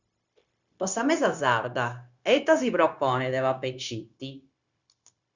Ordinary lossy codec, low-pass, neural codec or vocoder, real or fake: Opus, 64 kbps; 7.2 kHz; codec, 16 kHz, 0.9 kbps, LongCat-Audio-Codec; fake